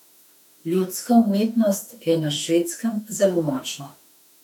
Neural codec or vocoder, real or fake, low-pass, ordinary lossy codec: autoencoder, 48 kHz, 32 numbers a frame, DAC-VAE, trained on Japanese speech; fake; 19.8 kHz; none